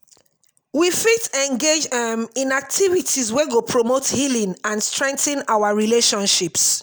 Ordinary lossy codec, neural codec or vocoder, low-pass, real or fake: none; none; none; real